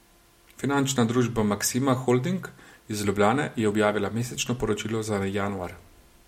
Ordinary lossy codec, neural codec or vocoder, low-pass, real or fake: MP3, 64 kbps; none; 19.8 kHz; real